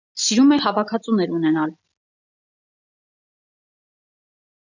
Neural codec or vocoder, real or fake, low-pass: none; real; 7.2 kHz